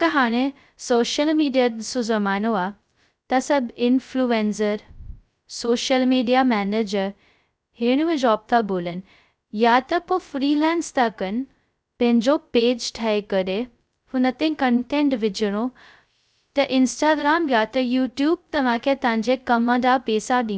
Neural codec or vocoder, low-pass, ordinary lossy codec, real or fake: codec, 16 kHz, 0.2 kbps, FocalCodec; none; none; fake